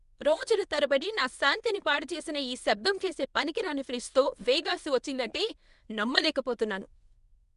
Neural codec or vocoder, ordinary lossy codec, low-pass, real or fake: codec, 24 kHz, 0.9 kbps, WavTokenizer, medium speech release version 1; none; 10.8 kHz; fake